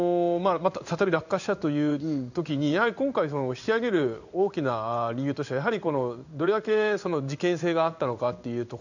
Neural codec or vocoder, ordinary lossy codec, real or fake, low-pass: codec, 16 kHz in and 24 kHz out, 1 kbps, XY-Tokenizer; none; fake; 7.2 kHz